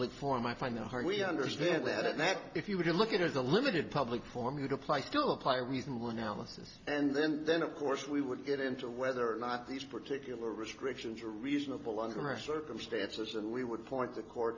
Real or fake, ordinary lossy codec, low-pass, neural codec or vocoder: real; AAC, 48 kbps; 7.2 kHz; none